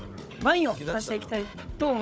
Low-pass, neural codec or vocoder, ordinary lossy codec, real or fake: none; codec, 16 kHz, 16 kbps, FunCodec, trained on Chinese and English, 50 frames a second; none; fake